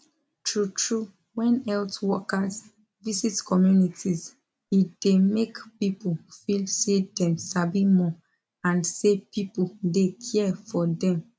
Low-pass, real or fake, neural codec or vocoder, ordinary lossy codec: none; real; none; none